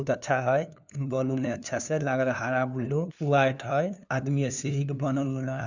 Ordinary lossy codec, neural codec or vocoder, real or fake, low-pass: none; codec, 16 kHz, 2 kbps, FunCodec, trained on LibriTTS, 25 frames a second; fake; 7.2 kHz